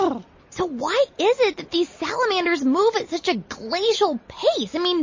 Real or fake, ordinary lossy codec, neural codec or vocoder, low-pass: real; MP3, 32 kbps; none; 7.2 kHz